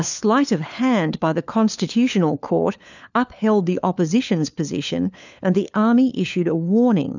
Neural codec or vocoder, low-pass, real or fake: codec, 16 kHz, 4 kbps, FunCodec, trained on LibriTTS, 50 frames a second; 7.2 kHz; fake